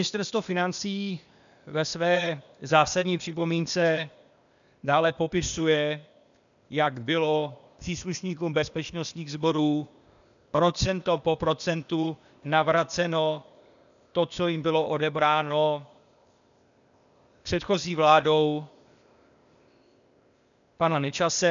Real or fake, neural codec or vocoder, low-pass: fake; codec, 16 kHz, 0.8 kbps, ZipCodec; 7.2 kHz